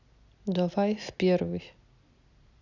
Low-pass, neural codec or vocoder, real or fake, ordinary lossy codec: 7.2 kHz; none; real; none